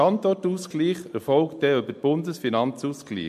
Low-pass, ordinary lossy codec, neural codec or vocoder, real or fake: 14.4 kHz; MP3, 64 kbps; none; real